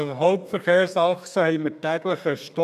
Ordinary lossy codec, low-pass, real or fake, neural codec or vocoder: none; 14.4 kHz; fake; codec, 32 kHz, 1.9 kbps, SNAC